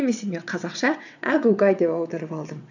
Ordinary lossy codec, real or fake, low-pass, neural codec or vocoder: none; fake; 7.2 kHz; vocoder, 22.05 kHz, 80 mel bands, WaveNeXt